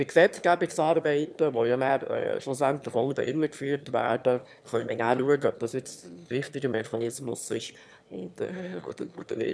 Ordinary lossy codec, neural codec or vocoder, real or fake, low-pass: none; autoencoder, 22.05 kHz, a latent of 192 numbers a frame, VITS, trained on one speaker; fake; none